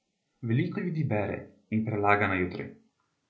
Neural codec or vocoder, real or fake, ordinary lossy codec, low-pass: none; real; none; none